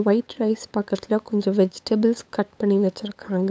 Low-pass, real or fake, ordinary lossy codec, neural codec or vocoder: none; fake; none; codec, 16 kHz, 8 kbps, FunCodec, trained on LibriTTS, 25 frames a second